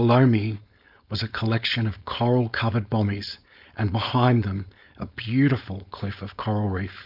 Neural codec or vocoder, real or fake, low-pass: codec, 16 kHz, 4.8 kbps, FACodec; fake; 5.4 kHz